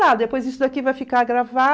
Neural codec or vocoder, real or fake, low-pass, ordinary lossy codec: none; real; none; none